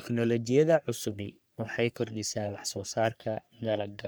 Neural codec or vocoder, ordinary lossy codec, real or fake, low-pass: codec, 44.1 kHz, 3.4 kbps, Pupu-Codec; none; fake; none